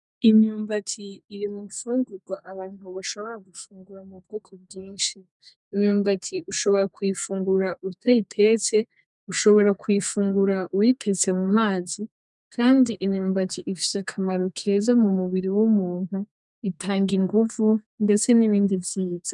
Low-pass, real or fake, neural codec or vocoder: 10.8 kHz; fake; codec, 32 kHz, 1.9 kbps, SNAC